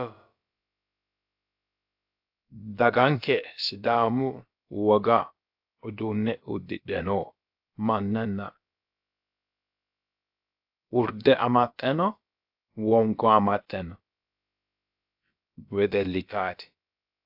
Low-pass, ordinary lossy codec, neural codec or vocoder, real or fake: 5.4 kHz; AAC, 48 kbps; codec, 16 kHz, about 1 kbps, DyCAST, with the encoder's durations; fake